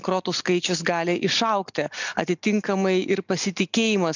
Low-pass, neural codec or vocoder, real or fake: 7.2 kHz; none; real